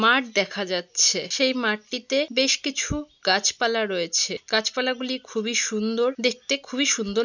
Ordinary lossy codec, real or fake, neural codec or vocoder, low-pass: none; real; none; 7.2 kHz